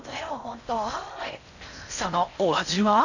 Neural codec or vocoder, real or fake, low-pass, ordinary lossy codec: codec, 16 kHz in and 24 kHz out, 0.6 kbps, FocalCodec, streaming, 4096 codes; fake; 7.2 kHz; none